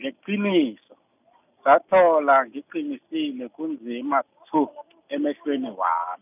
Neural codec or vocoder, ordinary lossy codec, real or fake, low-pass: none; none; real; 3.6 kHz